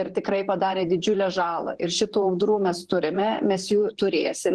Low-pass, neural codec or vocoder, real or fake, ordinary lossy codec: 10.8 kHz; vocoder, 44.1 kHz, 128 mel bands, Pupu-Vocoder; fake; Opus, 16 kbps